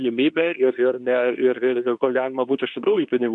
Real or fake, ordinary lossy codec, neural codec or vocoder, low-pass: fake; MP3, 96 kbps; codec, 24 kHz, 0.9 kbps, WavTokenizer, medium speech release version 2; 10.8 kHz